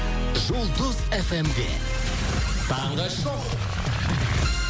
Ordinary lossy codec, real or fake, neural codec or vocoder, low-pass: none; real; none; none